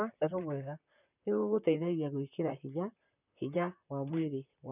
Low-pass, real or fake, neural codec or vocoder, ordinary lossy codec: 3.6 kHz; fake; vocoder, 44.1 kHz, 128 mel bands, Pupu-Vocoder; none